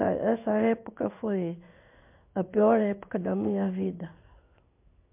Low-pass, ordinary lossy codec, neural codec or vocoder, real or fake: 3.6 kHz; MP3, 32 kbps; codec, 16 kHz in and 24 kHz out, 1 kbps, XY-Tokenizer; fake